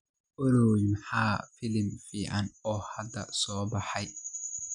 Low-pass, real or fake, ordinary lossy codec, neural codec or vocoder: 9.9 kHz; real; none; none